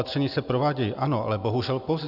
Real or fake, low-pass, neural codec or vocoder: real; 5.4 kHz; none